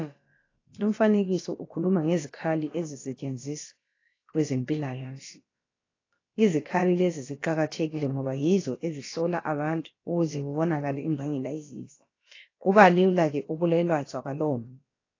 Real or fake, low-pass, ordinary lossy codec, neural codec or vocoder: fake; 7.2 kHz; AAC, 32 kbps; codec, 16 kHz, about 1 kbps, DyCAST, with the encoder's durations